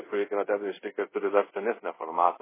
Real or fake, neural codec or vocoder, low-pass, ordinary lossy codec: fake; codec, 24 kHz, 0.5 kbps, DualCodec; 3.6 kHz; MP3, 16 kbps